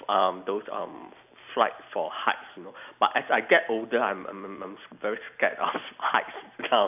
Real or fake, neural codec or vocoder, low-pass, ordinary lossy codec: real; none; 3.6 kHz; none